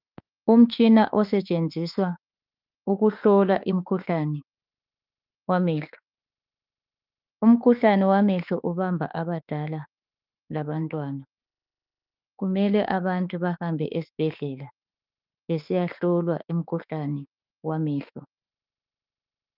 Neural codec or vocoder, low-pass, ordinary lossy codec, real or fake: autoencoder, 48 kHz, 32 numbers a frame, DAC-VAE, trained on Japanese speech; 5.4 kHz; Opus, 32 kbps; fake